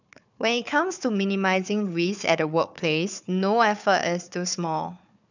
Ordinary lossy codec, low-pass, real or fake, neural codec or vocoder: none; 7.2 kHz; fake; codec, 16 kHz, 4 kbps, FunCodec, trained on Chinese and English, 50 frames a second